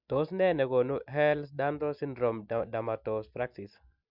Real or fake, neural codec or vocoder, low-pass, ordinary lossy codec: real; none; 5.4 kHz; MP3, 48 kbps